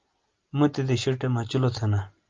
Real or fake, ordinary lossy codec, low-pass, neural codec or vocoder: real; Opus, 32 kbps; 7.2 kHz; none